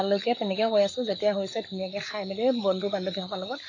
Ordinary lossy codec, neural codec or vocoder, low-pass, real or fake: AAC, 32 kbps; codec, 44.1 kHz, 7.8 kbps, Pupu-Codec; 7.2 kHz; fake